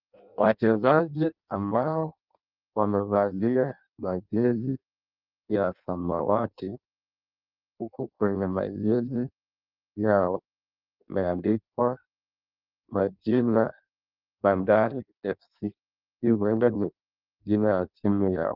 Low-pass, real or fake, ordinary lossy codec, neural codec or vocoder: 5.4 kHz; fake; Opus, 24 kbps; codec, 16 kHz in and 24 kHz out, 0.6 kbps, FireRedTTS-2 codec